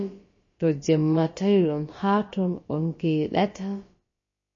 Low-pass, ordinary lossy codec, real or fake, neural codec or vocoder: 7.2 kHz; MP3, 32 kbps; fake; codec, 16 kHz, about 1 kbps, DyCAST, with the encoder's durations